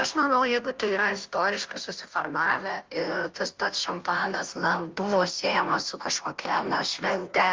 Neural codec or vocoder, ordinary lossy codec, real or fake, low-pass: codec, 16 kHz, 0.5 kbps, FunCodec, trained on Chinese and English, 25 frames a second; Opus, 24 kbps; fake; 7.2 kHz